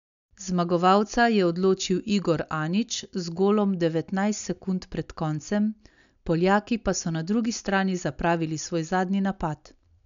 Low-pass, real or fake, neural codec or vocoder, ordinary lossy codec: 7.2 kHz; real; none; none